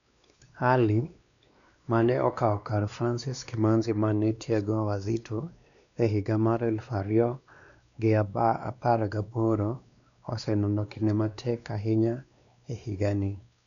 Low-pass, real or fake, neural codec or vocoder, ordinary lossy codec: 7.2 kHz; fake; codec, 16 kHz, 2 kbps, X-Codec, WavLM features, trained on Multilingual LibriSpeech; none